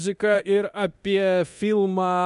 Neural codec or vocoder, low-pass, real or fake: codec, 24 kHz, 0.9 kbps, DualCodec; 10.8 kHz; fake